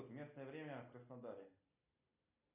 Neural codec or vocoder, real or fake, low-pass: none; real; 3.6 kHz